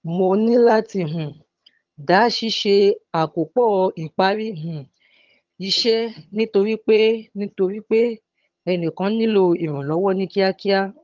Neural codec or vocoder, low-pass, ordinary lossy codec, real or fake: vocoder, 22.05 kHz, 80 mel bands, HiFi-GAN; 7.2 kHz; Opus, 24 kbps; fake